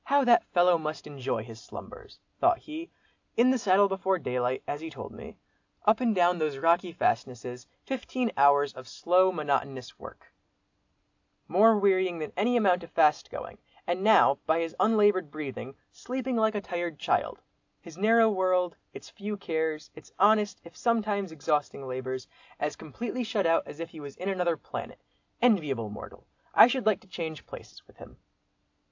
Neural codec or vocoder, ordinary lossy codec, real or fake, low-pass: none; AAC, 48 kbps; real; 7.2 kHz